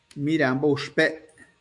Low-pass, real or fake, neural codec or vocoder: 10.8 kHz; fake; autoencoder, 48 kHz, 128 numbers a frame, DAC-VAE, trained on Japanese speech